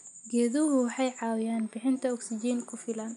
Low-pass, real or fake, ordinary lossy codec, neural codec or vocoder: 10.8 kHz; real; none; none